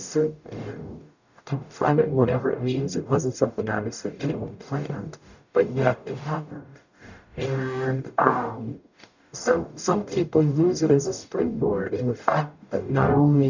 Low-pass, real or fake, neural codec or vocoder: 7.2 kHz; fake; codec, 44.1 kHz, 0.9 kbps, DAC